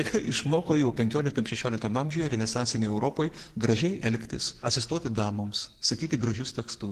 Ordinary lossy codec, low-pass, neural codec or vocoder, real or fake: Opus, 16 kbps; 14.4 kHz; codec, 44.1 kHz, 2.6 kbps, SNAC; fake